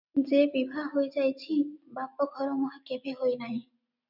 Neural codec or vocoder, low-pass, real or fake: none; 5.4 kHz; real